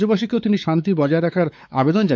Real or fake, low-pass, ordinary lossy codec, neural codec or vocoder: fake; none; none; codec, 16 kHz, 4 kbps, X-Codec, WavLM features, trained on Multilingual LibriSpeech